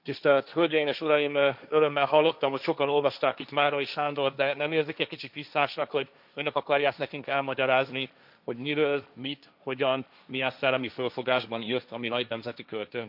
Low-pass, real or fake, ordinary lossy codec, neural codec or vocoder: 5.4 kHz; fake; none; codec, 16 kHz, 1.1 kbps, Voila-Tokenizer